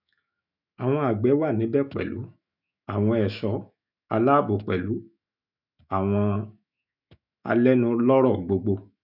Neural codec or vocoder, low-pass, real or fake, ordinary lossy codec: autoencoder, 48 kHz, 128 numbers a frame, DAC-VAE, trained on Japanese speech; 5.4 kHz; fake; none